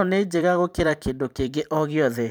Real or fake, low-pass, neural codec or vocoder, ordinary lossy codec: fake; none; vocoder, 44.1 kHz, 128 mel bands every 512 samples, BigVGAN v2; none